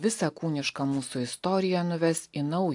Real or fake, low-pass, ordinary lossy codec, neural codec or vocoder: real; 10.8 kHz; AAC, 64 kbps; none